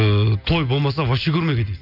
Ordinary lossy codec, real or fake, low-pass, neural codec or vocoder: none; real; 5.4 kHz; none